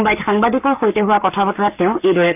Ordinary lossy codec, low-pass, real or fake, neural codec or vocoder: none; 3.6 kHz; fake; codec, 44.1 kHz, 7.8 kbps, Pupu-Codec